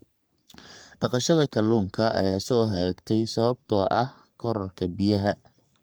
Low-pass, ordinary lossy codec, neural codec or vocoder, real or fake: none; none; codec, 44.1 kHz, 3.4 kbps, Pupu-Codec; fake